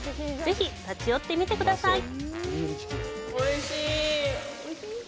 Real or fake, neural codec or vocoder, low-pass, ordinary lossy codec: real; none; none; none